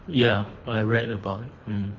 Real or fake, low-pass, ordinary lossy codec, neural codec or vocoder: fake; 7.2 kHz; MP3, 48 kbps; codec, 24 kHz, 3 kbps, HILCodec